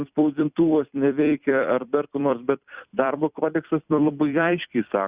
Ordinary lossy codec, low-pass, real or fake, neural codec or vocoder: Opus, 64 kbps; 3.6 kHz; fake; vocoder, 44.1 kHz, 128 mel bands every 256 samples, BigVGAN v2